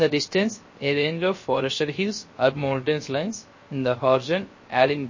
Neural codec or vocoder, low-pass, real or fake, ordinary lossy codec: codec, 16 kHz, 0.3 kbps, FocalCodec; 7.2 kHz; fake; MP3, 32 kbps